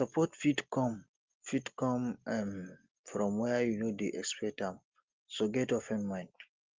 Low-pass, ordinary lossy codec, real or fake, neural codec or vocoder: 7.2 kHz; Opus, 24 kbps; real; none